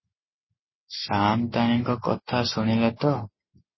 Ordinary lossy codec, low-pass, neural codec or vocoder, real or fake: MP3, 24 kbps; 7.2 kHz; none; real